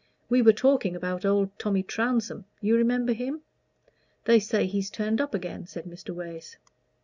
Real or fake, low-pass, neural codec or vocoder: real; 7.2 kHz; none